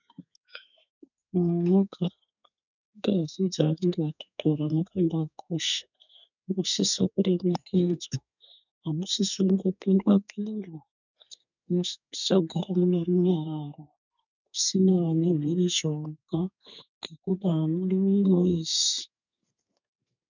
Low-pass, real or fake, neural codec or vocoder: 7.2 kHz; fake; codec, 32 kHz, 1.9 kbps, SNAC